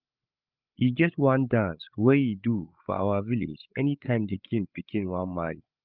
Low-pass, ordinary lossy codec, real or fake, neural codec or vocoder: 5.4 kHz; Opus, 24 kbps; fake; codec, 16 kHz, 8 kbps, FreqCodec, larger model